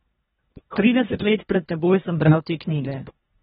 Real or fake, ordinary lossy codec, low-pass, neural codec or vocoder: fake; AAC, 16 kbps; 10.8 kHz; codec, 24 kHz, 1.5 kbps, HILCodec